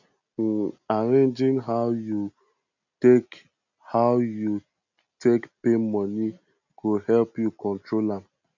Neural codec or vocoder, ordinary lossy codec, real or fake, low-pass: none; none; real; 7.2 kHz